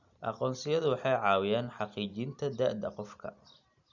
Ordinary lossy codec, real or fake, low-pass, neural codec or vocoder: none; real; 7.2 kHz; none